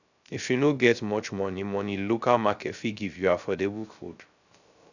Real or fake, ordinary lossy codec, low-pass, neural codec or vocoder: fake; none; 7.2 kHz; codec, 16 kHz, 0.3 kbps, FocalCodec